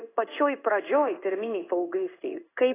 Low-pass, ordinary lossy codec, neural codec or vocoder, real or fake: 3.6 kHz; AAC, 16 kbps; codec, 16 kHz in and 24 kHz out, 1 kbps, XY-Tokenizer; fake